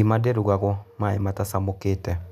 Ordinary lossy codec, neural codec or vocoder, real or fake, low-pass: none; none; real; 14.4 kHz